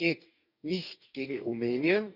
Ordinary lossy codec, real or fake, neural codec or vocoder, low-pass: none; fake; codec, 44.1 kHz, 2.6 kbps, DAC; 5.4 kHz